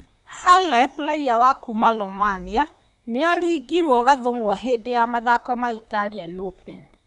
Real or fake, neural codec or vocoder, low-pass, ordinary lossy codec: fake; codec, 24 kHz, 1 kbps, SNAC; 10.8 kHz; none